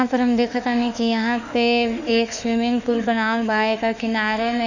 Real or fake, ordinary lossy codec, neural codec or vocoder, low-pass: fake; none; autoencoder, 48 kHz, 32 numbers a frame, DAC-VAE, trained on Japanese speech; 7.2 kHz